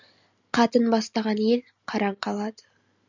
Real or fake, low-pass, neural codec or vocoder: real; 7.2 kHz; none